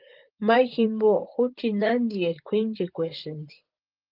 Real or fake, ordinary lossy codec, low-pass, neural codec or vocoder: fake; Opus, 32 kbps; 5.4 kHz; vocoder, 44.1 kHz, 128 mel bands, Pupu-Vocoder